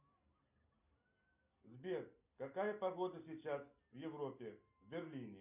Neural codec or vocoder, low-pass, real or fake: none; 3.6 kHz; real